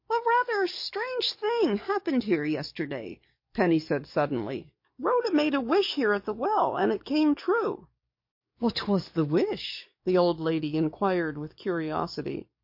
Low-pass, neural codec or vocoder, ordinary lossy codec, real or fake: 5.4 kHz; codec, 44.1 kHz, 7.8 kbps, DAC; MP3, 32 kbps; fake